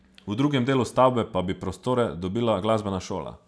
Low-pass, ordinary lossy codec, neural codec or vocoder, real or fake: none; none; none; real